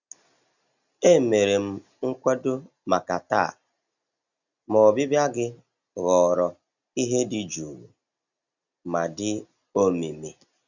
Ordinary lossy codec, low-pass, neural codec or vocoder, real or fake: none; 7.2 kHz; none; real